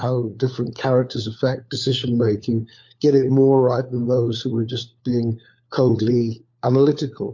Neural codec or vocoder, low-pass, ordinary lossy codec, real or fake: codec, 16 kHz, 4 kbps, FunCodec, trained on LibriTTS, 50 frames a second; 7.2 kHz; MP3, 48 kbps; fake